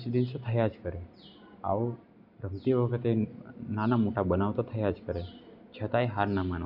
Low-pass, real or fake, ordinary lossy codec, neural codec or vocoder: 5.4 kHz; real; none; none